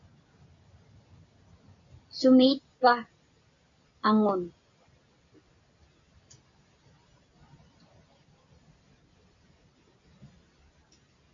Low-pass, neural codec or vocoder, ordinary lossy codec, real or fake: 7.2 kHz; none; AAC, 64 kbps; real